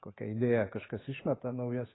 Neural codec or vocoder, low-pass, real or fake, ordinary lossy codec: none; 7.2 kHz; real; AAC, 16 kbps